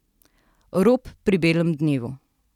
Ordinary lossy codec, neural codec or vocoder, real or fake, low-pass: none; none; real; 19.8 kHz